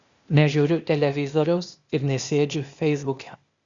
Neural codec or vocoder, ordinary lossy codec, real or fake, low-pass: codec, 16 kHz, 0.8 kbps, ZipCodec; Opus, 64 kbps; fake; 7.2 kHz